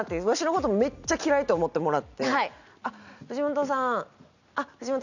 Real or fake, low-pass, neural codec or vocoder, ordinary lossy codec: real; 7.2 kHz; none; none